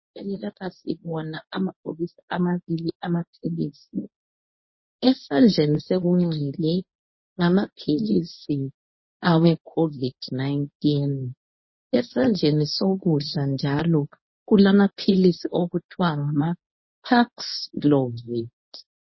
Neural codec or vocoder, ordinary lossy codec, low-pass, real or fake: codec, 24 kHz, 0.9 kbps, WavTokenizer, medium speech release version 1; MP3, 24 kbps; 7.2 kHz; fake